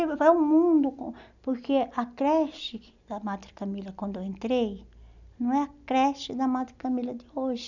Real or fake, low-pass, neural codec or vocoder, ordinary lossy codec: real; 7.2 kHz; none; none